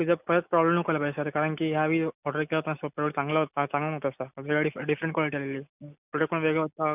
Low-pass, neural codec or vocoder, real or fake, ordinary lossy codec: 3.6 kHz; none; real; none